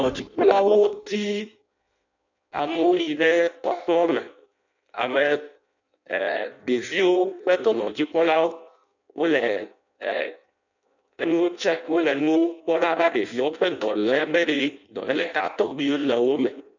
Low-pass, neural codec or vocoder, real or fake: 7.2 kHz; codec, 16 kHz in and 24 kHz out, 0.6 kbps, FireRedTTS-2 codec; fake